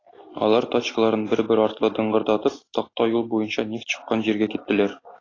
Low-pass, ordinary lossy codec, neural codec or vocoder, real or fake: 7.2 kHz; AAC, 32 kbps; none; real